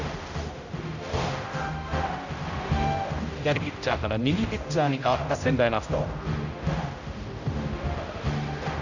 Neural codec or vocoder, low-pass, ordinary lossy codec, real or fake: codec, 16 kHz, 0.5 kbps, X-Codec, HuBERT features, trained on general audio; 7.2 kHz; none; fake